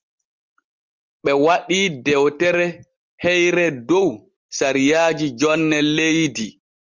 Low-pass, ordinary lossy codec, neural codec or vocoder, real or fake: 7.2 kHz; Opus, 32 kbps; none; real